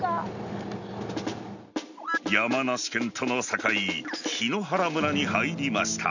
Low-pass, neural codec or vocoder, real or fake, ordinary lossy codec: 7.2 kHz; none; real; none